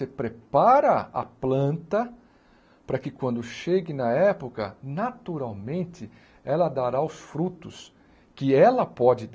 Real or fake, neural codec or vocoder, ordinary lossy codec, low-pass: real; none; none; none